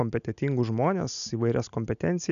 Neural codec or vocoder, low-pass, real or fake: none; 7.2 kHz; real